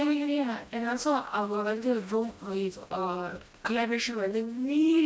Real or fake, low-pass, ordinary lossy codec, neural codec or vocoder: fake; none; none; codec, 16 kHz, 1 kbps, FreqCodec, smaller model